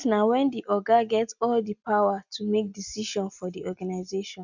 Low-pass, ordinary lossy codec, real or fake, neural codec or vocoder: 7.2 kHz; none; real; none